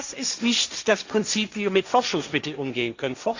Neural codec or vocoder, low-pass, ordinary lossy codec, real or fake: codec, 16 kHz, 1.1 kbps, Voila-Tokenizer; 7.2 kHz; Opus, 64 kbps; fake